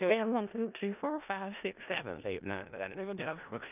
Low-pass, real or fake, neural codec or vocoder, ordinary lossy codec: 3.6 kHz; fake; codec, 16 kHz in and 24 kHz out, 0.4 kbps, LongCat-Audio-Codec, four codebook decoder; none